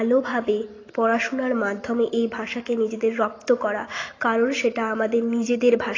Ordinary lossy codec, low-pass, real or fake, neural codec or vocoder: AAC, 32 kbps; 7.2 kHz; real; none